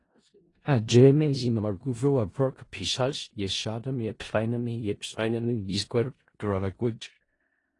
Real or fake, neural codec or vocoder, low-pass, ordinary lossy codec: fake; codec, 16 kHz in and 24 kHz out, 0.4 kbps, LongCat-Audio-Codec, four codebook decoder; 10.8 kHz; AAC, 32 kbps